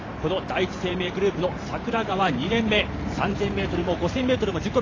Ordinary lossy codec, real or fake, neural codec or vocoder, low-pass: AAC, 32 kbps; fake; vocoder, 44.1 kHz, 128 mel bands every 512 samples, BigVGAN v2; 7.2 kHz